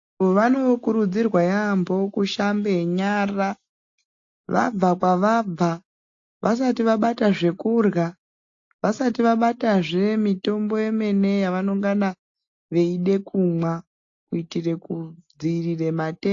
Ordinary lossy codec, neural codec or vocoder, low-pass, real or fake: AAC, 48 kbps; none; 7.2 kHz; real